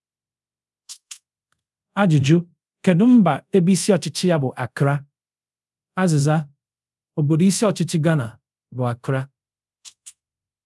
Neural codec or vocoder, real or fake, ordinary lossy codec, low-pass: codec, 24 kHz, 0.5 kbps, DualCodec; fake; none; none